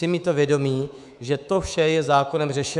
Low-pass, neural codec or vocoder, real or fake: 10.8 kHz; codec, 24 kHz, 3.1 kbps, DualCodec; fake